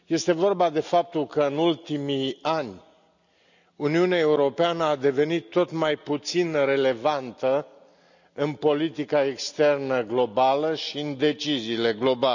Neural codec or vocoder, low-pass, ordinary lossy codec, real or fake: none; 7.2 kHz; none; real